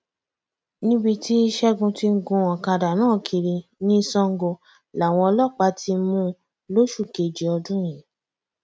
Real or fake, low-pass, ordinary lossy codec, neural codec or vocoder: real; none; none; none